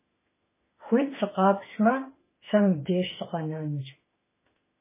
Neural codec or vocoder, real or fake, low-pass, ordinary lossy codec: autoencoder, 48 kHz, 32 numbers a frame, DAC-VAE, trained on Japanese speech; fake; 3.6 kHz; MP3, 16 kbps